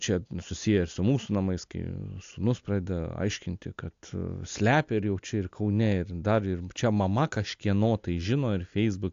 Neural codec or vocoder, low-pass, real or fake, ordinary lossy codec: none; 7.2 kHz; real; AAC, 64 kbps